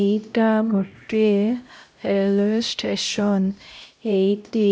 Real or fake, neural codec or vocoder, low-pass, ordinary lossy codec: fake; codec, 16 kHz, 0.5 kbps, X-Codec, HuBERT features, trained on LibriSpeech; none; none